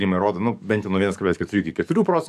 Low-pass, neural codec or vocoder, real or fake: 14.4 kHz; codec, 44.1 kHz, 7.8 kbps, DAC; fake